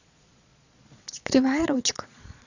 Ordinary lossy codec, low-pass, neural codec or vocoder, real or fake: none; 7.2 kHz; vocoder, 22.05 kHz, 80 mel bands, Vocos; fake